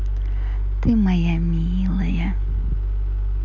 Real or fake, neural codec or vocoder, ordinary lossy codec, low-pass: real; none; none; 7.2 kHz